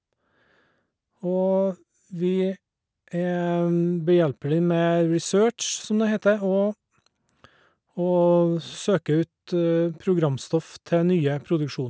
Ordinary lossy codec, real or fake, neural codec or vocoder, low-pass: none; real; none; none